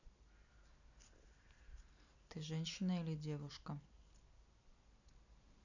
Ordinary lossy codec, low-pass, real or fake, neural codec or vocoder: none; 7.2 kHz; real; none